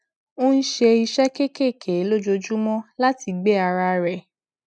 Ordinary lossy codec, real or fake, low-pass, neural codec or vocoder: none; real; none; none